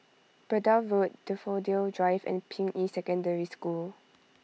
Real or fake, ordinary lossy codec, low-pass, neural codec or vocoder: real; none; none; none